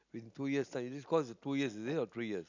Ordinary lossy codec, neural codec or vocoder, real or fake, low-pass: none; none; real; 7.2 kHz